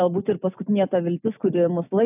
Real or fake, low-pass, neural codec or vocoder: real; 3.6 kHz; none